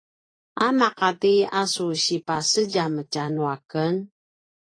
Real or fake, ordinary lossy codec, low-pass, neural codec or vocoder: fake; AAC, 32 kbps; 9.9 kHz; vocoder, 24 kHz, 100 mel bands, Vocos